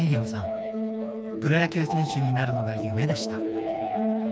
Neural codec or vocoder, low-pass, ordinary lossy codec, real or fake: codec, 16 kHz, 2 kbps, FreqCodec, smaller model; none; none; fake